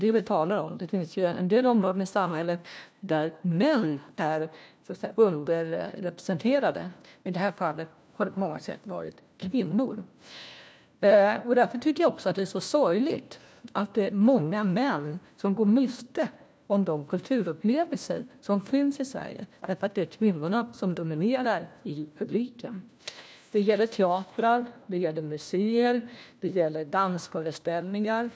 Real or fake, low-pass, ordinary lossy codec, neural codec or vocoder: fake; none; none; codec, 16 kHz, 1 kbps, FunCodec, trained on LibriTTS, 50 frames a second